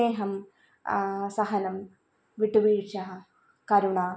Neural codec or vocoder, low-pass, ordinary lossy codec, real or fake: none; none; none; real